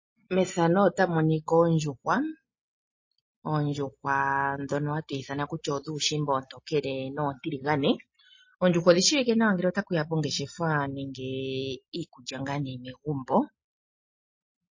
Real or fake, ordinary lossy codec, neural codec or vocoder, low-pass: real; MP3, 32 kbps; none; 7.2 kHz